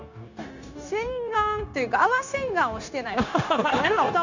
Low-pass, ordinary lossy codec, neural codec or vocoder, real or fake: 7.2 kHz; none; codec, 16 kHz, 0.9 kbps, LongCat-Audio-Codec; fake